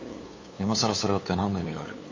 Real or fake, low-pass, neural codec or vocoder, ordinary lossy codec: fake; 7.2 kHz; codec, 16 kHz, 8 kbps, FunCodec, trained on LibriTTS, 25 frames a second; MP3, 32 kbps